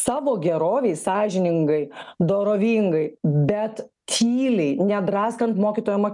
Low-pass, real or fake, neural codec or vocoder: 10.8 kHz; real; none